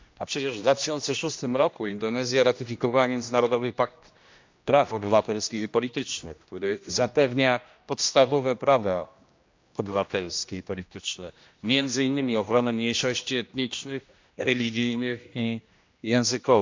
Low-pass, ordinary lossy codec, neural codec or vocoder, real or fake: 7.2 kHz; MP3, 64 kbps; codec, 16 kHz, 1 kbps, X-Codec, HuBERT features, trained on general audio; fake